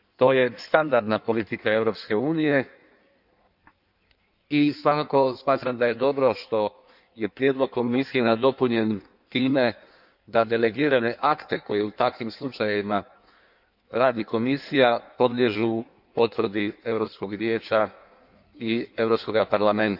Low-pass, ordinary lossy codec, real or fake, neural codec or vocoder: 5.4 kHz; none; fake; codec, 16 kHz in and 24 kHz out, 1.1 kbps, FireRedTTS-2 codec